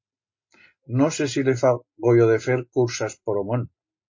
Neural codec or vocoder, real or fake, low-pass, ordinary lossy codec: none; real; 7.2 kHz; MP3, 32 kbps